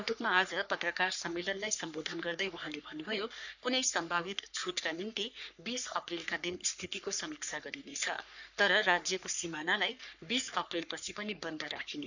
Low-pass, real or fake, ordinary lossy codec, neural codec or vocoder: 7.2 kHz; fake; none; codec, 44.1 kHz, 3.4 kbps, Pupu-Codec